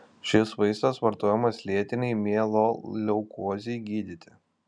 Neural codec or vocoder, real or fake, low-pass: none; real; 9.9 kHz